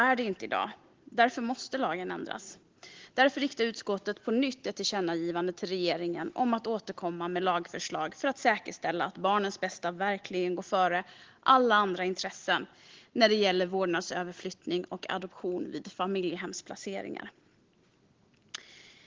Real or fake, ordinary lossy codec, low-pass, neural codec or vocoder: real; Opus, 16 kbps; 7.2 kHz; none